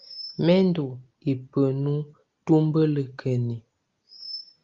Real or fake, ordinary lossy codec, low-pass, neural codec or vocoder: real; Opus, 32 kbps; 7.2 kHz; none